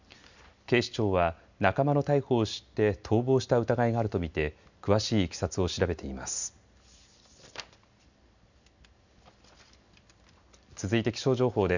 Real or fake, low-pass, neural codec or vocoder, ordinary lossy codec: real; 7.2 kHz; none; none